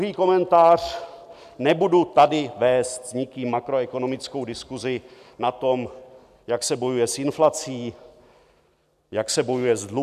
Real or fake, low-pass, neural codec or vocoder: real; 14.4 kHz; none